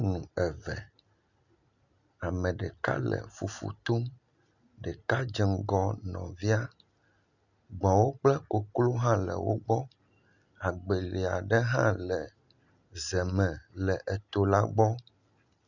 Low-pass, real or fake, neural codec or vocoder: 7.2 kHz; real; none